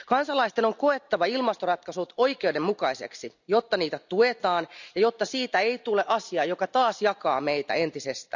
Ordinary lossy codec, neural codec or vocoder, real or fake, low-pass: none; none; real; 7.2 kHz